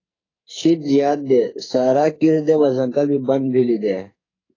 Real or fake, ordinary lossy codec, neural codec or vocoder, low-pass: fake; AAC, 32 kbps; codec, 44.1 kHz, 2.6 kbps, SNAC; 7.2 kHz